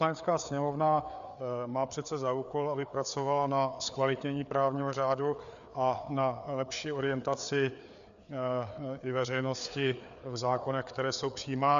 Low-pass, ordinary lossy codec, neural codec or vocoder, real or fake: 7.2 kHz; MP3, 96 kbps; codec, 16 kHz, 4 kbps, FreqCodec, larger model; fake